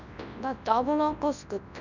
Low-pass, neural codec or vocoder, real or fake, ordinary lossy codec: 7.2 kHz; codec, 24 kHz, 0.9 kbps, WavTokenizer, large speech release; fake; none